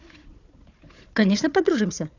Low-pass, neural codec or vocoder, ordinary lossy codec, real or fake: 7.2 kHz; codec, 16 kHz, 4 kbps, FunCodec, trained on Chinese and English, 50 frames a second; none; fake